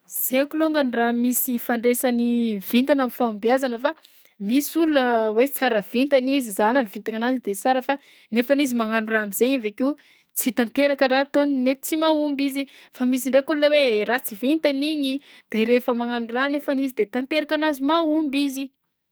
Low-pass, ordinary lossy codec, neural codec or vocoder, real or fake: none; none; codec, 44.1 kHz, 2.6 kbps, SNAC; fake